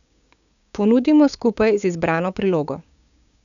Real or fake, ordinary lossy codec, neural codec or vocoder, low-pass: fake; none; codec, 16 kHz, 6 kbps, DAC; 7.2 kHz